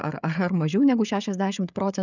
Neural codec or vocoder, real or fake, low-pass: codec, 16 kHz, 16 kbps, FreqCodec, smaller model; fake; 7.2 kHz